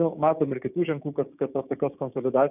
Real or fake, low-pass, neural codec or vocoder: fake; 3.6 kHz; codec, 16 kHz, 6 kbps, DAC